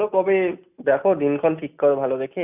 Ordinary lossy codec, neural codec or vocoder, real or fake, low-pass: none; none; real; 3.6 kHz